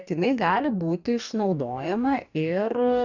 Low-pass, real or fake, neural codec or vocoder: 7.2 kHz; fake; codec, 44.1 kHz, 2.6 kbps, DAC